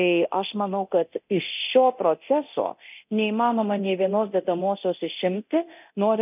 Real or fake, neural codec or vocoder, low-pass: fake; codec, 24 kHz, 0.9 kbps, DualCodec; 3.6 kHz